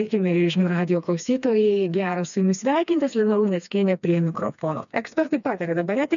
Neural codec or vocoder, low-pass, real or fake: codec, 16 kHz, 2 kbps, FreqCodec, smaller model; 7.2 kHz; fake